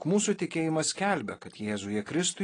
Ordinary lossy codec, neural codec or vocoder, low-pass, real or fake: AAC, 32 kbps; none; 9.9 kHz; real